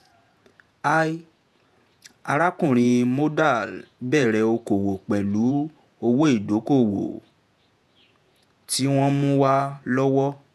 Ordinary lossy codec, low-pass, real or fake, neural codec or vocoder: none; 14.4 kHz; fake; vocoder, 48 kHz, 128 mel bands, Vocos